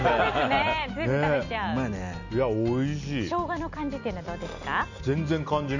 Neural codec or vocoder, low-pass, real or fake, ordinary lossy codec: none; 7.2 kHz; real; none